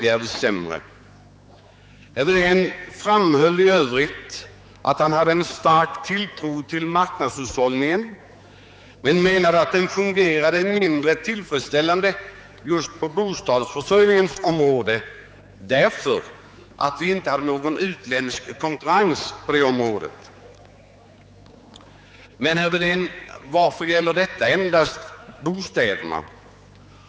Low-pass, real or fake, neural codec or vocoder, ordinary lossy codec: none; fake; codec, 16 kHz, 4 kbps, X-Codec, HuBERT features, trained on general audio; none